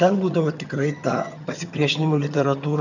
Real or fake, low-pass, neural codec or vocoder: fake; 7.2 kHz; vocoder, 22.05 kHz, 80 mel bands, HiFi-GAN